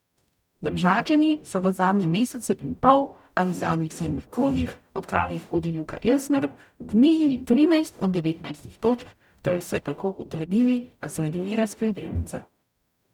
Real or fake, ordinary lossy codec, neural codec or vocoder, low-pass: fake; none; codec, 44.1 kHz, 0.9 kbps, DAC; 19.8 kHz